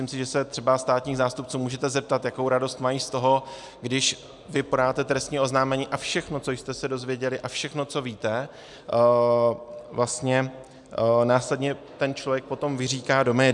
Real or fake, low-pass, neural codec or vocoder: real; 10.8 kHz; none